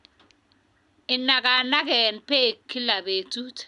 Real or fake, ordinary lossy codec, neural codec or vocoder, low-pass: real; none; none; 10.8 kHz